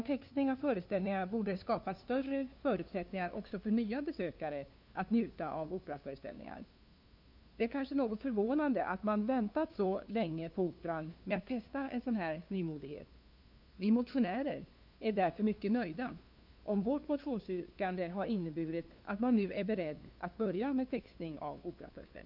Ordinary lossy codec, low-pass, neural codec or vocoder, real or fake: none; 5.4 kHz; codec, 16 kHz, 2 kbps, FunCodec, trained on LibriTTS, 25 frames a second; fake